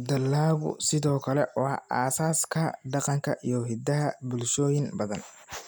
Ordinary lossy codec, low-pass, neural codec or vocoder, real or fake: none; none; none; real